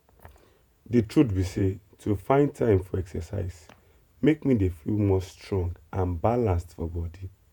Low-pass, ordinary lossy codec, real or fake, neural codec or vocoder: 19.8 kHz; none; fake; vocoder, 48 kHz, 128 mel bands, Vocos